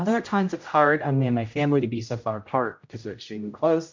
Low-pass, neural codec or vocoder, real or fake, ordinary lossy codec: 7.2 kHz; codec, 16 kHz, 0.5 kbps, X-Codec, HuBERT features, trained on general audio; fake; MP3, 48 kbps